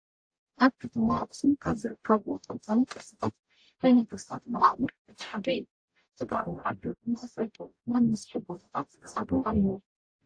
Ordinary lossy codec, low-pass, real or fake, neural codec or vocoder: AAC, 48 kbps; 9.9 kHz; fake; codec, 44.1 kHz, 0.9 kbps, DAC